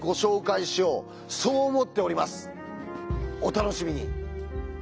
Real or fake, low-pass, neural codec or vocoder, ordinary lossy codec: real; none; none; none